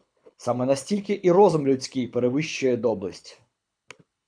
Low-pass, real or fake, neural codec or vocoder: 9.9 kHz; fake; codec, 24 kHz, 6 kbps, HILCodec